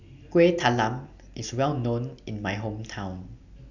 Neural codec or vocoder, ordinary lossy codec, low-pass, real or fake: none; none; 7.2 kHz; real